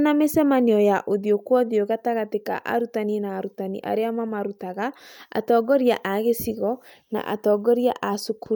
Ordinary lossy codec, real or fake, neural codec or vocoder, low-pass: none; real; none; none